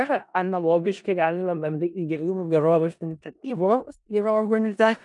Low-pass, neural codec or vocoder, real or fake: 10.8 kHz; codec, 16 kHz in and 24 kHz out, 0.4 kbps, LongCat-Audio-Codec, four codebook decoder; fake